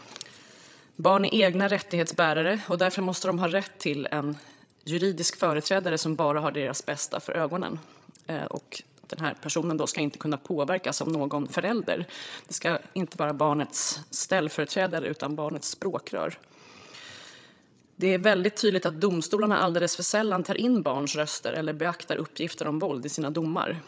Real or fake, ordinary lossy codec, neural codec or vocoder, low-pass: fake; none; codec, 16 kHz, 16 kbps, FreqCodec, larger model; none